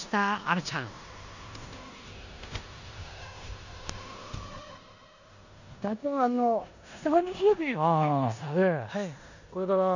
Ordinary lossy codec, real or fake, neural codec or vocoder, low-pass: none; fake; codec, 16 kHz in and 24 kHz out, 0.9 kbps, LongCat-Audio-Codec, four codebook decoder; 7.2 kHz